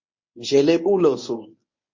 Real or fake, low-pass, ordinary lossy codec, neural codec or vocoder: fake; 7.2 kHz; MP3, 32 kbps; codec, 24 kHz, 0.9 kbps, WavTokenizer, medium speech release version 1